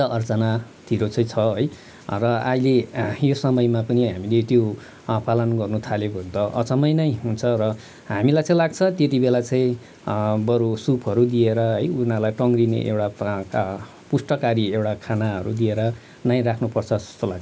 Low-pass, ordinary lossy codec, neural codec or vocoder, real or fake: none; none; none; real